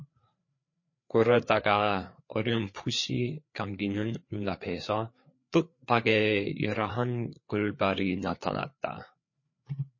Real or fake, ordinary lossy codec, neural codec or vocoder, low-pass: fake; MP3, 32 kbps; codec, 16 kHz, 4 kbps, FreqCodec, larger model; 7.2 kHz